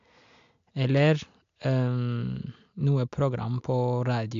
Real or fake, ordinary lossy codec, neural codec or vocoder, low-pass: real; AAC, 64 kbps; none; 7.2 kHz